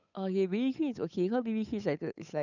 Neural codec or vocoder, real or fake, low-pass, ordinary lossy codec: codec, 16 kHz, 8 kbps, FunCodec, trained on Chinese and English, 25 frames a second; fake; 7.2 kHz; none